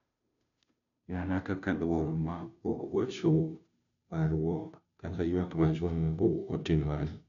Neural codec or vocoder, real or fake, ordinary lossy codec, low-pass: codec, 16 kHz, 0.5 kbps, FunCodec, trained on Chinese and English, 25 frames a second; fake; MP3, 96 kbps; 7.2 kHz